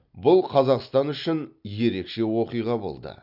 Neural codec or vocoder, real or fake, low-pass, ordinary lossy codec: none; real; 5.4 kHz; none